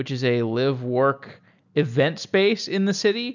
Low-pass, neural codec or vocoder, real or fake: 7.2 kHz; none; real